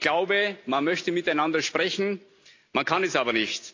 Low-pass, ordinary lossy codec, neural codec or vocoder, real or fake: 7.2 kHz; AAC, 48 kbps; none; real